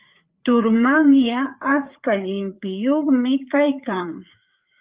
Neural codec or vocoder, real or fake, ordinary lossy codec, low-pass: codec, 16 kHz, 4 kbps, FreqCodec, larger model; fake; Opus, 24 kbps; 3.6 kHz